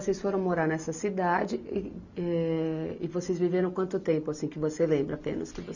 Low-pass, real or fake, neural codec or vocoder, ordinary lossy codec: 7.2 kHz; real; none; none